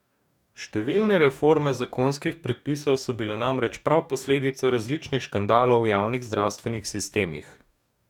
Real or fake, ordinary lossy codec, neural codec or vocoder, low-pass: fake; none; codec, 44.1 kHz, 2.6 kbps, DAC; 19.8 kHz